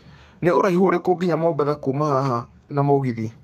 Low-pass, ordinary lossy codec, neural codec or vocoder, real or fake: 14.4 kHz; none; codec, 32 kHz, 1.9 kbps, SNAC; fake